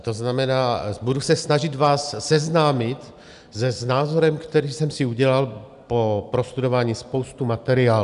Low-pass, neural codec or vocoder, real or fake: 10.8 kHz; none; real